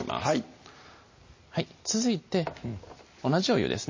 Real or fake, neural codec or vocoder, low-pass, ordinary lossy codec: real; none; 7.2 kHz; MP3, 32 kbps